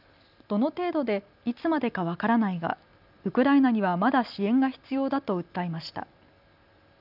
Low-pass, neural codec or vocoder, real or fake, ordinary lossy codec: 5.4 kHz; none; real; none